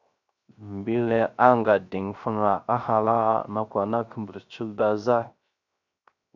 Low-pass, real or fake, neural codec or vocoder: 7.2 kHz; fake; codec, 16 kHz, 0.3 kbps, FocalCodec